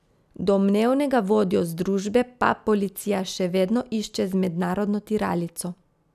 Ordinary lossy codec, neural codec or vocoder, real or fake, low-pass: none; none; real; 14.4 kHz